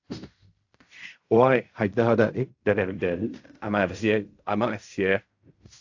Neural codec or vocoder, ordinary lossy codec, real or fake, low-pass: codec, 16 kHz in and 24 kHz out, 0.4 kbps, LongCat-Audio-Codec, fine tuned four codebook decoder; AAC, 48 kbps; fake; 7.2 kHz